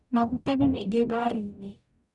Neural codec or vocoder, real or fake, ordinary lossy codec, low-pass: codec, 44.1 kHz, 0.9 kbps, DAC; fake; none; 10.8 kHz